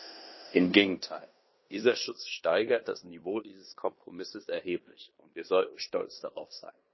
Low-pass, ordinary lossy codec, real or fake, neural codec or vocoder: 7.2 kHz; MP3, 24 kbps; fake; codec, 16 kHz in and 24 kHz out, 0.9 kbps, LongCat-Audio-Codec, fine tuned four codebook decoder